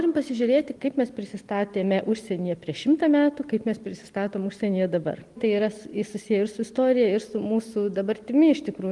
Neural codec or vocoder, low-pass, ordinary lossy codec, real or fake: none; 10.8 kHz; Opus, 24 kbps; real